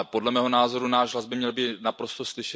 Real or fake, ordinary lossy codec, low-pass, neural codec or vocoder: real; none; none; none